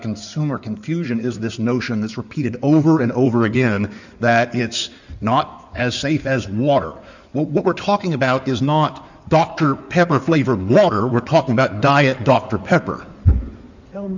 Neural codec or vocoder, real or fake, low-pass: codec, 16 kHz in and 24 kHz out, 2.2 kbps, FireRedTTS-2 codec; fake; 7.2 kHz